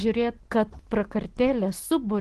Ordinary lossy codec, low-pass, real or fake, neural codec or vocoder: Opus, 16 kbps; 10.8 kHz; real; none